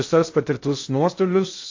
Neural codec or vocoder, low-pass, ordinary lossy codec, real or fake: codec, 16 kHz in and 24 kHz out, 0.6 kbps, FocalCodec, streaming, 2048 codes; 7.2 kHz; AAC, 48 kbps; fake